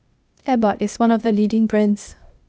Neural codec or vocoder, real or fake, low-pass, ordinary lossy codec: codec, 16 kHz, 0.8 kbps, ZipCodec; fake; none; none